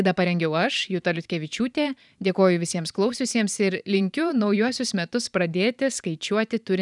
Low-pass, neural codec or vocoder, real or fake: 10.8 kHz; vocoder, 44.1 kHz, 128 mel bands every 512 samples, BigVGAN v2; fake